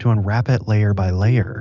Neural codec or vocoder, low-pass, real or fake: none; 7.2 kHz; real